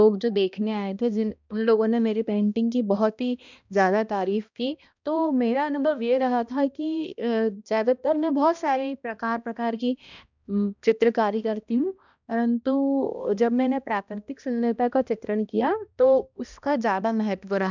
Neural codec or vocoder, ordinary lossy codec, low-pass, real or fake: codec, 16 kHz, 1 kbps, X-Codec, HuBERT features, trained on balanced general audio; none; 7.2 kHz; fake